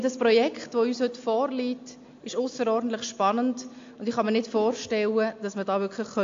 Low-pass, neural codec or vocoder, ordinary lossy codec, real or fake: 7.2 kHz; none; AAC, 48 kbps; real